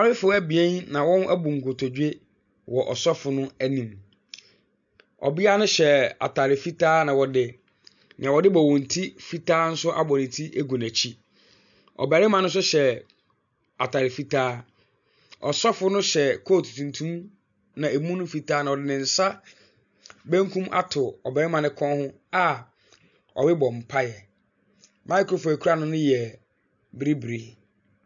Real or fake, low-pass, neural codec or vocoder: real; 7.2 kHz; none